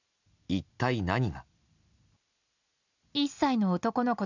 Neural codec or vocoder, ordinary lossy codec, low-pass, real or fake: none; none; 7.2 kHz; real